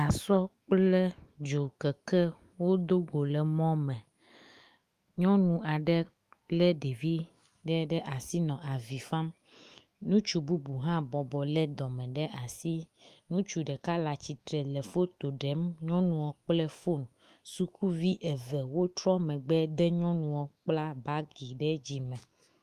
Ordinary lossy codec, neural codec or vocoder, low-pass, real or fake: Opus, 32 kbps; autoencoder, 48 kHz, 128 numbers a frame, DAC-VAE, trained on Japanese speech; 14.4 kHz; fake